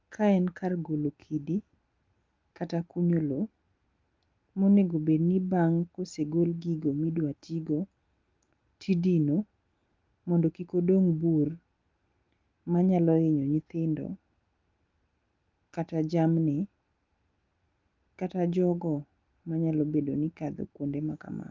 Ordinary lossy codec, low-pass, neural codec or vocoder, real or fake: Opus, 32 kbps; 7.2 kHz; none; real